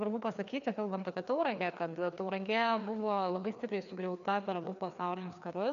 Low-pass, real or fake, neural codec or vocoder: 7.2 kHz; fake; codec, 16 kHz, 2 kbps, FreqCodec, larger model